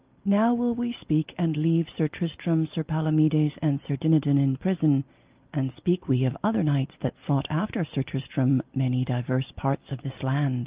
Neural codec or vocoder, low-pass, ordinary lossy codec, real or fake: none; 3.6 kHz; Opus, 32 kbps; real